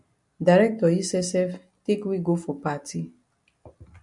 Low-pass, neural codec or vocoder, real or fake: 10.8 kHz; none; real